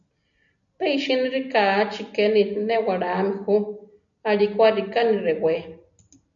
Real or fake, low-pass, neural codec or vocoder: real; 7.2 kHz; none